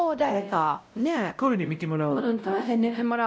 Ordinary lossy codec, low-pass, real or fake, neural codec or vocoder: none; none; fake; codec, 16 kHz, 0.5 kbps, X-Codec, WavLM features, trained on Multilingual LibriSpeech